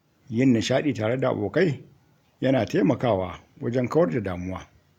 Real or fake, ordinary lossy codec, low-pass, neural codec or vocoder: real; none; 19.8 kHz; none